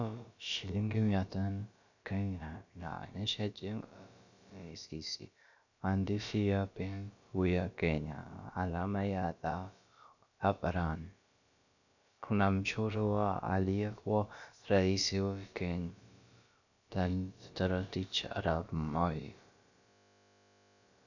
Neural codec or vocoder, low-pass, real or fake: codec, 16 kHz, about 1 kbps, DyCAST, with the encoder's durations; 7.2 kHz; fake